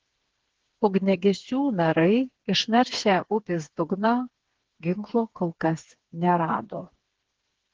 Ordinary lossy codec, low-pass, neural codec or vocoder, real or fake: Opus, 16 kbps; 7.2 kHz; codec, 16 kHz, 4 kbps, FreqCodec, smaller model; fake